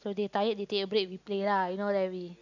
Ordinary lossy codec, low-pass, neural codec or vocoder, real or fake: none; 7.2 kHz; none; real